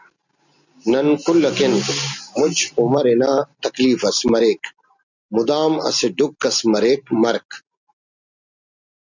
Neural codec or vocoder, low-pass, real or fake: none; 7.2 kHz; real